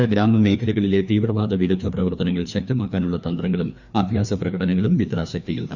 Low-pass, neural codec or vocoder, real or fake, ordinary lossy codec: 7.2 kHz; codec, 16 kHz, 2 kbps, FreqCodec, larger model; fake; none